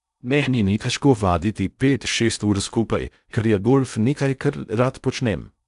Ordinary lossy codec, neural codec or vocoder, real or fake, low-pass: none; codec, 16 kHz in and 24 kHz out, 0.6 kbps, FocalCodec, streaming, 2048 codes; fake; 10.8 kHz